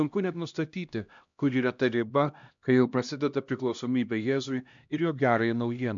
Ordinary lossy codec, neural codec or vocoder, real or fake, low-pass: MP3, 64 kbps; codec, 16 kHz, 1 kbps, X-Codec, HuBERT features, trained on LibriSpeech; fake; 7.2 kHz